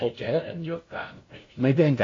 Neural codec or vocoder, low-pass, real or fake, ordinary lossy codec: codec, 16 kHz, 0.5 kbps, FunCodec, trained on LibriTTS, 25 frames a second; 7.2 kHz; fake; AAC, 32 kbps